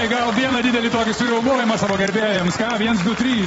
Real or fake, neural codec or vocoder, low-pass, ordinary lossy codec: fake; vocoder, 44.1 kHz, 128 mel bands every 256 samples, BigVGAN v2; 19.8 kHz; AAC, 24 kbps